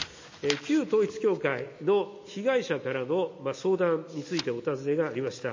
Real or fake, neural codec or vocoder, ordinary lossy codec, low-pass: real; none; MP3, 32 kbps; 7.2 kHz